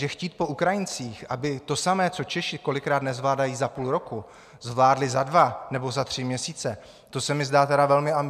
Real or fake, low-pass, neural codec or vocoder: real; 14.4 kHz; none